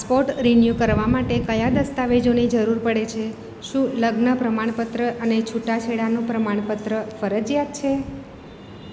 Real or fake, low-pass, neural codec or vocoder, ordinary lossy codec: real; none; none; none